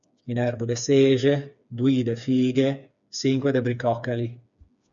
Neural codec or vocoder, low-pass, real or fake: codec, 16 kHz, 4 kbps, FreqCodec, smaller model; 7.2 kHz; fake